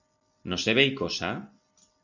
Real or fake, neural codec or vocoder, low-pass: real; none; 7.2 kHz